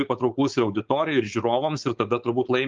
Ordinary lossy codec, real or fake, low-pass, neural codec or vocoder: Opus, 32 kbps; fake; 7.2 kHz; codec, 16 kHz, 4.8 kbps, FACodec